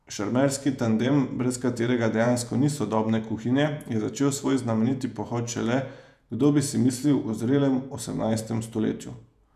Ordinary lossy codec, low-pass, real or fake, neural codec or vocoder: none; 14.4 kHz; fake; vocoder, 48 kHz, 128 mel bands, Vocos